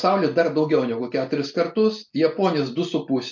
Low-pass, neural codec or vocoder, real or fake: 7.2 kHz; none; real